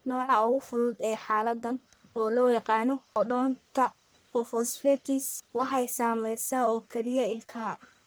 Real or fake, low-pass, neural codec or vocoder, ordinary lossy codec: fake; none; codec, 44.1 kHz, 1.7 kbps, Pupu-Codec; none